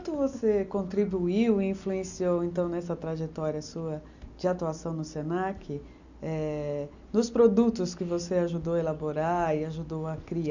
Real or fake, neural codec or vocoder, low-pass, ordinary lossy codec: real; none; 7.2 kHz; none